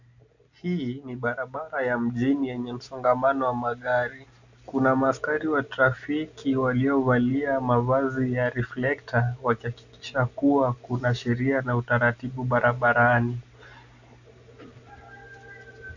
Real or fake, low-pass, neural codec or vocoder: real; 7.2 kHz; none